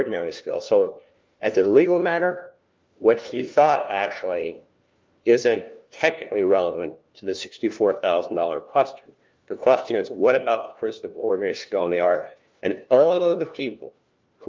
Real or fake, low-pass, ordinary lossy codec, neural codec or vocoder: fake; 7.2 kHz; Opus, 32 kbps; codec, 16 kHz, 1 kbps, FunCodec, trained on LibriTTS, 50 frames a second